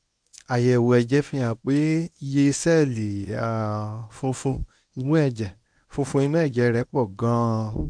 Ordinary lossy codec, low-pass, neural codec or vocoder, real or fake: none; 9.9 kHz; codec, 24 kHz, 0.9 kbps, WavTokenizer, medium speech release version 1; fake